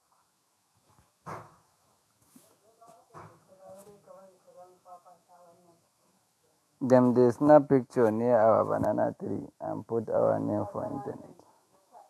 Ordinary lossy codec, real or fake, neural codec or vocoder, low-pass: AAC, 64 kbps; fake; autoencoder, 48 kHz, 128 numbers a frame, DAC-VAE, trained on Japanese speech; 14.4 kHz